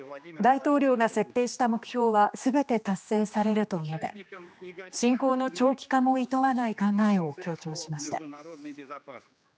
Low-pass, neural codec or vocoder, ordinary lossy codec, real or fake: none; codec, 16 kHz, 2 kbps, X-Codec, HuBERT features, trained on general audio; none; fake